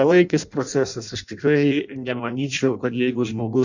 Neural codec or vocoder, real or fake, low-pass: codec, 16 kHz in and 24 kHz out, 0.6 kbps, FireRedTTS-2 codec; fake; 7.2 kHz